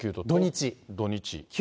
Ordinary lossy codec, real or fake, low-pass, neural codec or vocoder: none; real; none; none